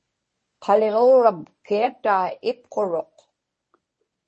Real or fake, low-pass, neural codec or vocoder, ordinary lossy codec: fake; 10.8 kHz; codec, 24 kHz, 0.9 kbps, WavTokenizer, medium speech release version 1; MP3, 32 kbps